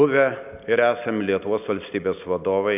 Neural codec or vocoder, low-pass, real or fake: none; 3.6 kHz; real